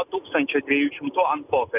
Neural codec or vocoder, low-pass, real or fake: none; 3.6 kHz; real